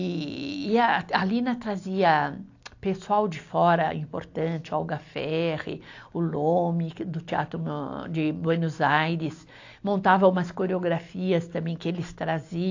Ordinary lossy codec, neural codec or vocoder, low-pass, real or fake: AAC, 48 kbps; none; 7.2 kHz; real